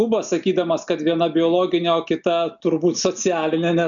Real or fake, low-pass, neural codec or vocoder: real; 7.2 kHz; none